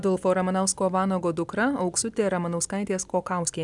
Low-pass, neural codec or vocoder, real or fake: 10.8 kHz; none; real